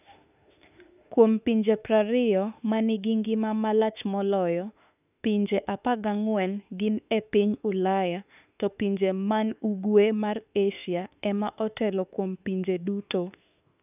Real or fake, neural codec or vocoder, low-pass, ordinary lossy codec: fake; autoencoder, 48 kHz, 32 numbers a frame, DAC-VAE, trained on Japanese speech; 3.6 kHz; none